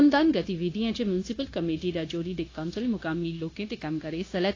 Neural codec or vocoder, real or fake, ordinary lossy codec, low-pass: codec, 16 kHz, 0.9 kbps, LongCat-Audio-Codec; fake; AAC, 32 kbps; 7.2 kHz